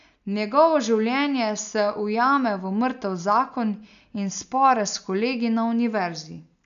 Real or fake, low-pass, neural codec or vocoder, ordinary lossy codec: real; 7.2 kHz; none; none